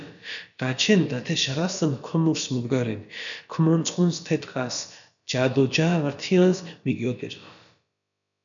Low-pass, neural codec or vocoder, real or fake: 7.2 kHz; codec, 16 kHz, about 1 kbps, DyCAST, with the encoder's durations; fake